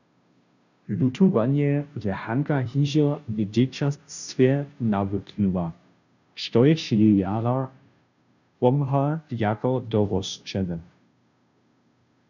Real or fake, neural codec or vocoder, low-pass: fake; codec, 16 kHz, 0.5 kbps, FunCodec, trained on Chinese and English, 25 frames a second; 7.2 kHz